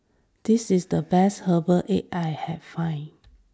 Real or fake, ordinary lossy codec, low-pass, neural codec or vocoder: real; none; none; none